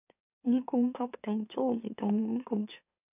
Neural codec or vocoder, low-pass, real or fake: autoencoder, 44.1 kHz, a latent of 192 numbers a frame, MeloTTS; 3.6 kHz; fake